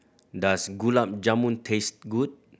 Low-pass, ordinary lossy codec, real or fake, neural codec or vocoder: none; none; real; none